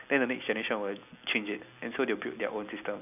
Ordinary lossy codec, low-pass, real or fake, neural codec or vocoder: none; 3.6 kHz; real; none